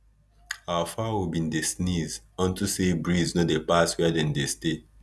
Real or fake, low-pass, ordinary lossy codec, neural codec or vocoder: real; none; none; none